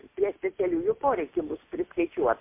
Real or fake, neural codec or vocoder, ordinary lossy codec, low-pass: real; none; MP3, 24 kbps; 3.6 kHz